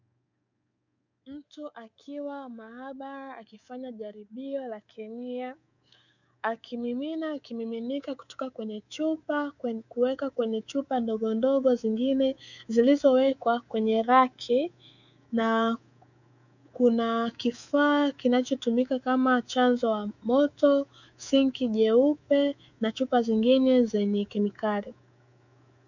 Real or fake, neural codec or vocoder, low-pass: fake; codec, 24 kHz, 3.1 kbps, DualCodec; 7.2 kHz